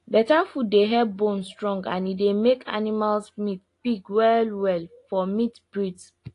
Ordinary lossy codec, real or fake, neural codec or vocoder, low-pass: AAC, 48 kbps; real; none; 10.8 kHz